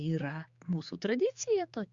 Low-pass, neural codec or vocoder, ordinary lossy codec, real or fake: 7.2 kHz; codec, 16 kHz, 4 kbps, X-Codec, HuBERT features, trained on general audio; Opus, 64 kbps; fake